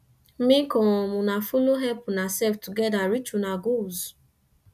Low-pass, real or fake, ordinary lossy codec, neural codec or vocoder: 14.4 kHz; real; none; none